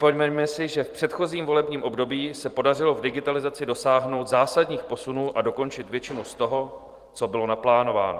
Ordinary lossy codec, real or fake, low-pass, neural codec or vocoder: Opus, 24 kbps; fake; 14.4 kHz; vocoder, 44.1 kHz, 128 mel bands every 512 samples, BigVGAN v2